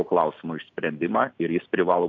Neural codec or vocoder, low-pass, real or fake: none; 7.2 kHz; real